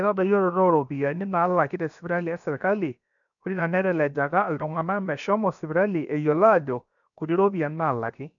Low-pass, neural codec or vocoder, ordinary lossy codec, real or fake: 7.2 kHz; codec, 16 kHz, about 1 kbps, DyCAST, with the encoder's durations; none; fake